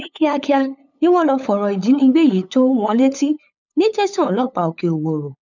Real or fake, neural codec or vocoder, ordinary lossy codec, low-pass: fake; codec, 16 kHz, 8 kbps, FunCodec, trained on LibriTTS, 25 frames a second; none; 7.2 kHz